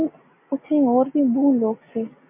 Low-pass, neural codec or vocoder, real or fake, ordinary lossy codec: 3.6 kHz; none; real; MP3, 24 kbps